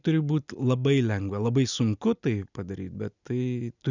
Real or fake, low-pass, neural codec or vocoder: real; 7.2 kHz; none